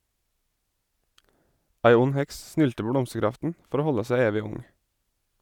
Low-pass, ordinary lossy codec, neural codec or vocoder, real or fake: 19.8 kHz; none; none; real